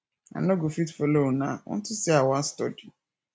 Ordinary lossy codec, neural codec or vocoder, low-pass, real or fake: none; none; none; real